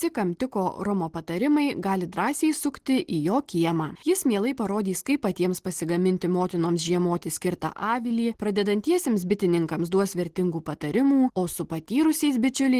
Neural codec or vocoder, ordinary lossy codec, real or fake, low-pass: none; Opus, 16 kbps; real; 14.4 kHz